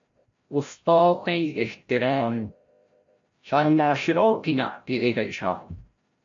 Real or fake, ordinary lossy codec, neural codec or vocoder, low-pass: fake; AAC, 48 kbps; codec, 16 kHz, 0.5 kbps, FreqCodec, larger model; 7.2 kHz